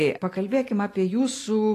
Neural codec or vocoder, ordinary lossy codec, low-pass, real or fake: none; AAC, 48 kbps; 14.4 kHz; real